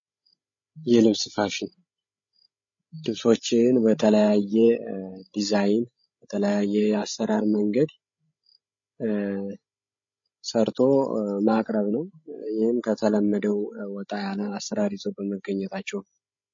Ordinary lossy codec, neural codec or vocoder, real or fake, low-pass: MP3, 32 kbps; codec, 16 kHz, 16 kbps, FreqCodec, larger model; fake; 7.2 kHz